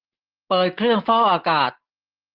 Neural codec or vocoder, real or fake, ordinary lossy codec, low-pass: none; real; Opus, 16 kbps; 5.4 kHz